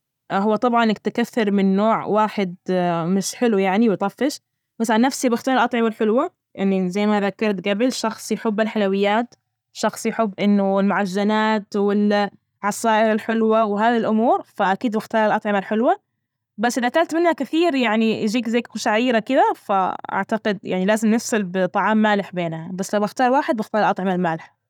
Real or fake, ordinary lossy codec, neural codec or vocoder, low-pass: real; none; none; 19.8 kHz